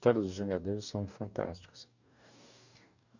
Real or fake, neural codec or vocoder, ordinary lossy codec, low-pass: fake; codec, 44.1 kHz, 2.6 kbps, DAC; none; 7.2 kHz